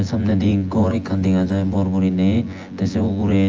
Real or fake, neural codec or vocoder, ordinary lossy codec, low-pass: fake; vocoder, 24 kHz, 100 mel bands, Vocos; Opus, 24 kbps; 7.2 kHz